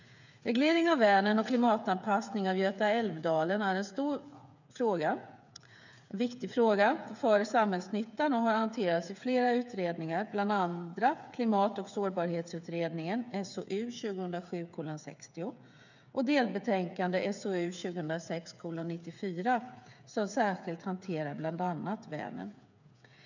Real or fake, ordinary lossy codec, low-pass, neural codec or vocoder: fake; none; 7.2 kHz; codec, 16 kHz, 8 kbps, FreqCodec, smaller model